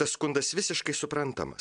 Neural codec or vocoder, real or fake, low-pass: none; real; 9.9 kHz